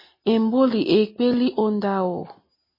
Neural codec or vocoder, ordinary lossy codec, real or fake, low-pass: none; MP3, 24 kbps; real; 5.4 kHz